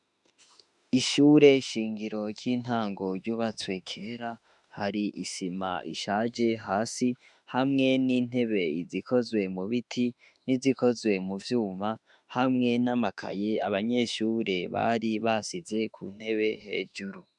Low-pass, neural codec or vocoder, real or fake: 10.8 kHz; autoencoder, 48 kHz, 32 numbers a frame, DAC-VAE, trained on Japanese speech; fake